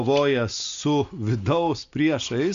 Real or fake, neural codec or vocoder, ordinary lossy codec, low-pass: real; none; Opus, 64 kbps; 7.2 kHz